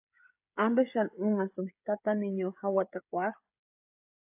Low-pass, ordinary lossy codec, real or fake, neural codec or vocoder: 3.6 kHz; AAC, 32 kbps; fake; codec, 16 kHz, 16 kbps, FreqCodec, smaller model